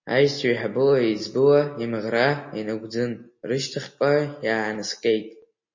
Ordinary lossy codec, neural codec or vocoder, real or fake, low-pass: MP3, 32 kbps; none; real; 7.2 kHz